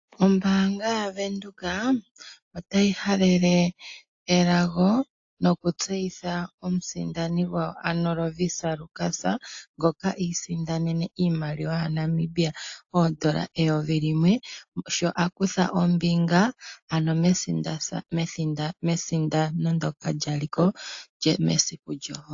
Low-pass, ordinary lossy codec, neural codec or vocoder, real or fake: 7.2 kHz; AAC, 48 kbps; none; real